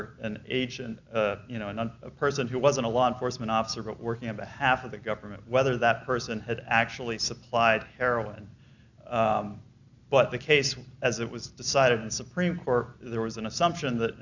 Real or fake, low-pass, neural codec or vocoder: real; 7.2 kHz; none